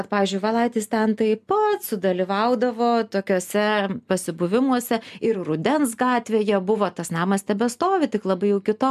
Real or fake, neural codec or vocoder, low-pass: real; none; 14.4 kHz